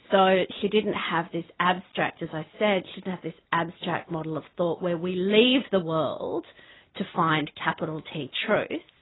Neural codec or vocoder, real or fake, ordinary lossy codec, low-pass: none; real; AAC, 16 kbps; 7.2 kHz